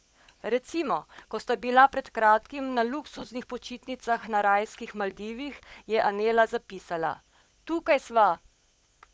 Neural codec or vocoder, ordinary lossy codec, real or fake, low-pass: codec, 16 kHz, 16 kbps, FunCodec, trained on LibriTTS, 50 frames a second; none; fake; none